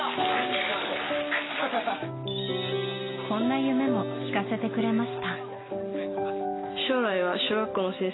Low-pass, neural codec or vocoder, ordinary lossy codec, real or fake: 7.2 kHz; none; AAC, 16 kbps; real